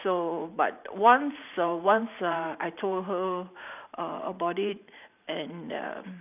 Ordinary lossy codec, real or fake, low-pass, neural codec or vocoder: none; fake; 3.6 kHz; vocoder, 44.1 kHz, 128 mel bands every 512 samples, BigVGAN v2